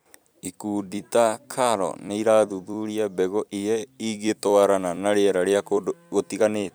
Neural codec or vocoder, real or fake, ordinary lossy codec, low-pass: vocoder, 44.1 kHz, 128 mel bands every 256 samples, BigVGAN v2; fake; none; none